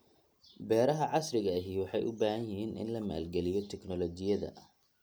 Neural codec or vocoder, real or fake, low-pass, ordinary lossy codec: none; real; none; none